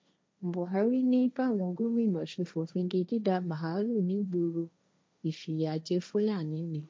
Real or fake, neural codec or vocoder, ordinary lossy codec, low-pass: fake; codec, 16 kHz, 1.1 kbps, Voila-Tokenizer; none; none